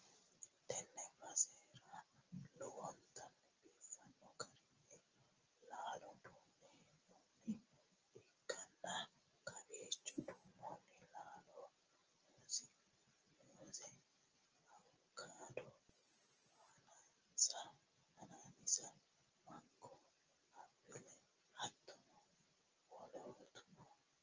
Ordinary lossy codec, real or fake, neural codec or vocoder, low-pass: Opus, 24 kbps; real; none; 7.2 kHz